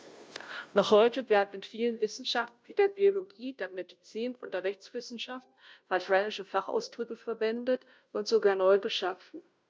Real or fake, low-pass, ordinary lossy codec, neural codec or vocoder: fake; none; none; codec, 16 kHz, 0.5 kbps, FunCodec, trained on Chinese and English, 25 frames a second